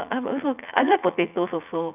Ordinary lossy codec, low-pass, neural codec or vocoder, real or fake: none; 3.6 kHz; vocoder, 44.1 kHz, 80 mel bands, Vocos; fake